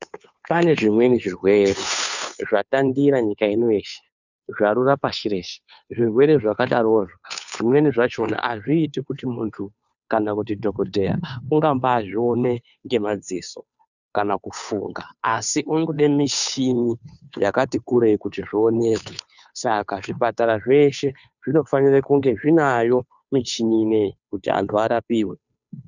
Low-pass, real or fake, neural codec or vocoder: 7.2 kHz; fake; codec, 16 kHz, 2 kbps, FunCodec, trained on Chinese and English, 25 frames a second